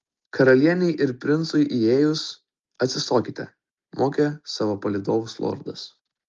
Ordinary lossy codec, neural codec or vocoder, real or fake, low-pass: Opus, 32 kbps; none; real; 7.2 kHz